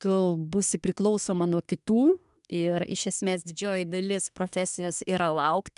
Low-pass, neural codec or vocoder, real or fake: 10.8 kHz; codec, 24 kHz, 1 kbps, SNAC; fake